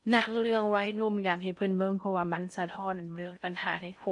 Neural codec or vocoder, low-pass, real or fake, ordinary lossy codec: codec, 16 kHz in and 24 kHz out, 0.6 kbps, FocalCodec, streaming, 4096 codes; 10.8 kHz; fake; none